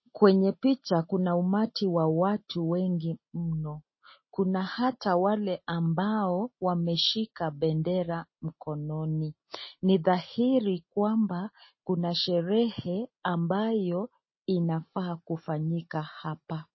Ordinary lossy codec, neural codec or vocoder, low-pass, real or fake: MP3, 24 kbps; none; 7.2 kHz; real